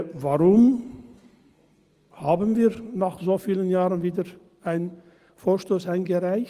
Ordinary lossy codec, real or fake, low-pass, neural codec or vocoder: Opus, 24 kbps; real; 14.4 kHz; none